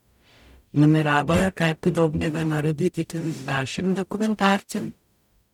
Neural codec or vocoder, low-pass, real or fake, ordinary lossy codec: codec, 44.1 kHz, 0.9 kbps, DAC; 19.8 kHz; fake; none